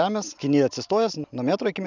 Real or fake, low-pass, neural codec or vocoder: real; 7.2 kHz; none